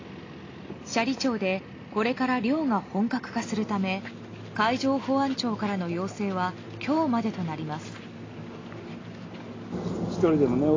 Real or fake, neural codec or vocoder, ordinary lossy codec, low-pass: real; none; AAC, 32 kbps; 7.2 kHz